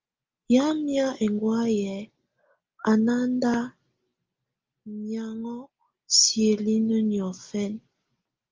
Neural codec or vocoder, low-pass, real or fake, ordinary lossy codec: none; 7.2 kHz; real; Opus, 24 kbps